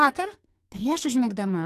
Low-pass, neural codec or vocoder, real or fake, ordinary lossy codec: 14.4 kHz; codec, 44.1 kHz, 2.6 kbps, SNAC; fake; AAC, 64 kbps